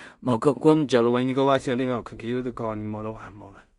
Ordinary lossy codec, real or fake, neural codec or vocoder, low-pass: Opus, 64 kbps; fake; codec, 16 kHz in and 24 kHz out, 0.4 kbps, LongCat-Audio-Codec, two codebook decoder; 10.8 kHz